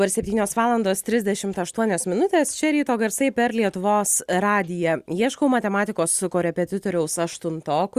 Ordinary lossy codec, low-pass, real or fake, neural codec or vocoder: Opus, 64 kbps; 14.4 kHz; real; none